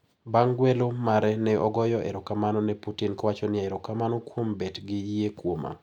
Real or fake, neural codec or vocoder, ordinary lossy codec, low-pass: real; none; none; 19.8 kHz